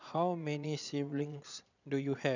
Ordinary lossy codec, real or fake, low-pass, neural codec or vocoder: none; fake; 7.2 kHz; vocoder, 22.05 kHz, 80 mel bands, Vocos